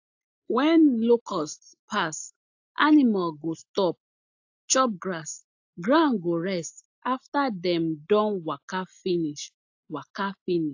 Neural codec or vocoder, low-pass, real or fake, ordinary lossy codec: none; 7.2 kHz; real; AAC, 48 kbps